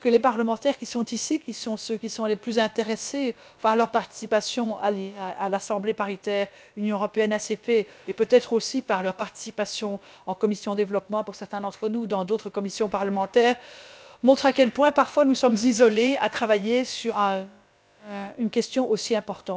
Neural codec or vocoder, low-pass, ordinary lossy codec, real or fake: codec, 16 kHz, about 1 kbps, DyCAST, with the encoder's durations; none; none; fake